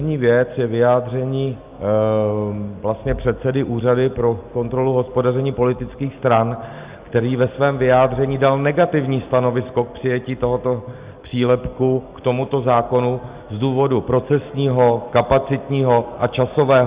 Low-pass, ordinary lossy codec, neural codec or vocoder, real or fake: 3.6 kHz; Opus, 64 kbps; none; real